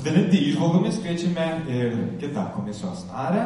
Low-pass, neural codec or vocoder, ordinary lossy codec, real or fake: 19.8 kHz; none; MP3, 48 kbps; real